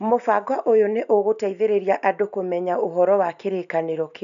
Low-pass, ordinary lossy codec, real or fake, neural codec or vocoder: 7.2 kHz; none; real; none